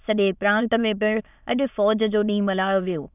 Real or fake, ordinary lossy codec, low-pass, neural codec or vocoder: fake; none; 3.6 kHz; autoencoder, 22.05 kHz, a latent of 192 numbers a frame, VITS, trained on many speakers